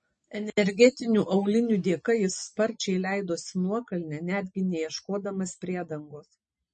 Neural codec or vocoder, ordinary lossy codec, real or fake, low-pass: none; MP3, 32 kbps; real; 10.8 kHz